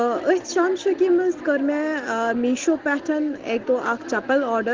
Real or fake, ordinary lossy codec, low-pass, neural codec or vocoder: real; Opus, 16 kbps; 7.2 kHz; none